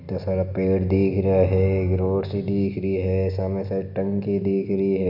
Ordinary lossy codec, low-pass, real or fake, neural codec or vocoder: none; 5.4 kHz; real; none